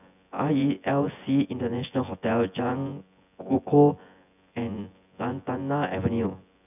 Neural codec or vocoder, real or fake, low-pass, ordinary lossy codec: vocoder, 24 kHz, 100 mel bands, Vocos; fake; 3.6 kHz; none